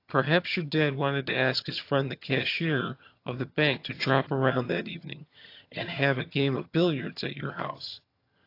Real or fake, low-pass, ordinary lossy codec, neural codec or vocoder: fake; 5.4 kHz; AAC, 32 kbps; vocoder, 22.05 kHz, 80 mel bands, HiFi-GAN